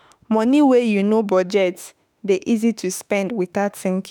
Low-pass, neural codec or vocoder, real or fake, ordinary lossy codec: none; autoencoder, 48 kHz, 32 numbers a frame, DAC-VAE, trained on Japanese speech; fake; none